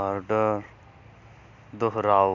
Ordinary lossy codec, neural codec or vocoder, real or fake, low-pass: none; none; real; 7.2 kHz